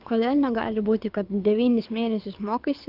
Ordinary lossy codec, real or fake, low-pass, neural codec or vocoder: Opus, 24 kbps; fake; 5.4 kHz; codec, 24 kHz, 6 kbps, HILCodec